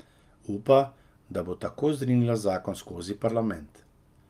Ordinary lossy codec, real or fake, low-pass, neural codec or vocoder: Opus, 32 kbps; real; 14.4 kHz; none